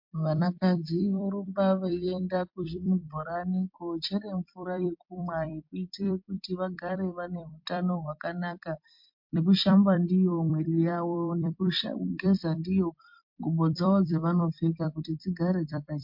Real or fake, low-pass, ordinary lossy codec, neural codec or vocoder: fake; 5.4 kHz; MP3, 48 kbps; vocoder, 44.1 kHz, 128 mel bands every 256 samples, BigVGAN v2